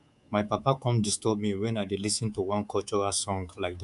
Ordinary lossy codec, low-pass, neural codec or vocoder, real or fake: none; 10.8 kHz; codec, 24 kHz, 3.1 kbps, DualCodec; fake